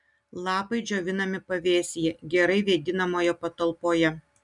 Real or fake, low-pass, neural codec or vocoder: real; 10.8 kHz; none